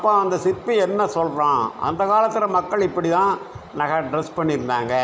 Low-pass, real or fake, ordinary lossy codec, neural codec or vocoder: none; real; none; none